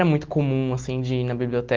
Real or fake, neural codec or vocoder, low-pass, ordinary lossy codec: real; none; 7.2 kHz; Opus, 16 kbps